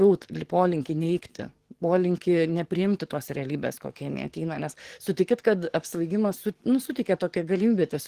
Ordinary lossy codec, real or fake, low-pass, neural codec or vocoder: Opus, 16 kbps; fake; 14.4 kHz; codec, 44.1 kHz, 7.8 kbps, DAC